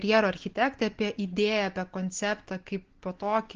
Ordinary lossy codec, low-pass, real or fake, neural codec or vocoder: Opus, 16 kbps; 7.2 kHz; real; none